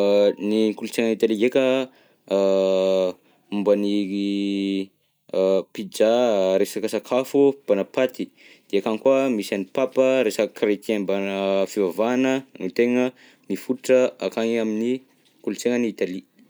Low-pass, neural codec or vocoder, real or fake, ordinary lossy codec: none; none; real; none